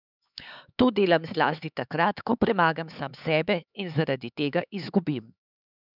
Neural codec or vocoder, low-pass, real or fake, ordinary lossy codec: codec, 16 kHz, 4 kbps, X-Codec, HuBERT features, trained on LibriSpeech; 5.4 kHz; fake; none